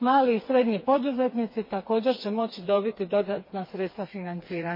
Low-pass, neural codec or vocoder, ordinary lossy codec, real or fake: 5.4 kHz; codec, 16 kHz, 4 kbps, FreqCodec, smaller model; MP3, 24 kbps; fake